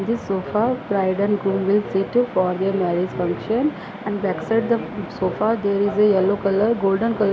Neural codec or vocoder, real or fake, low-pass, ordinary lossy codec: none; real; none; none